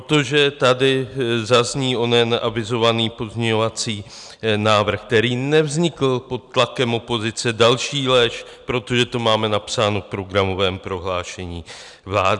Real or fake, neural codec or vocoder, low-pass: real; none; 10.8 kHz